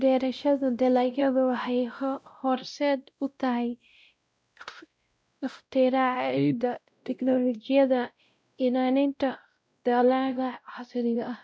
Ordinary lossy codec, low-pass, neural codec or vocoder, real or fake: none; none; codec, 16 kHz, 0.5 kbps, X-Codec, WavLM features, trained on Multilingual LibriSpeech; fake